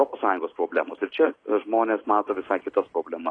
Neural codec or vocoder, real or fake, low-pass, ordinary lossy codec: none; real; 9.9 kHz; AAC, 32 kbps